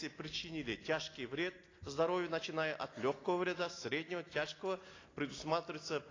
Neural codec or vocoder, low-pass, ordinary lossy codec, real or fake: none; 7.2 kHz; AAC, 32 kbps; real